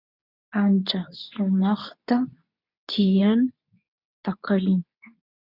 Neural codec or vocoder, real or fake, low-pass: codec, 24 kHz, 0.9 kbps, WavTokenizer, medium speech release version 2; fake; 5.4 kHz